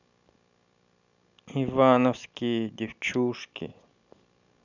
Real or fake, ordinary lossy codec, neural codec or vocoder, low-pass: real; none; none; 7.2 kHz